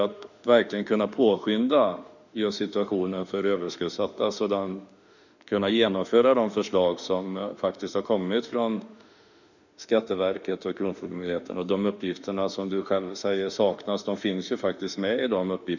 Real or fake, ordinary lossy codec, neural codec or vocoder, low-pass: fake; none; autoencoder, 48 kHz, 32 numbers a frame, DAC-VAE, trained on Japanese speech; 7.2 kHz